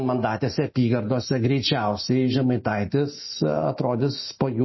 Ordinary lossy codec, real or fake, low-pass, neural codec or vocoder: MP3, 24 kbps; real; 7.2 kHz; none